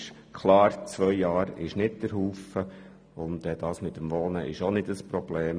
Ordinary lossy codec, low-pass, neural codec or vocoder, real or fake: none; none; none; real